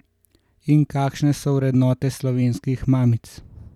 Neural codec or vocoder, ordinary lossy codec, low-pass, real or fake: none; none; 19.8 kHz; real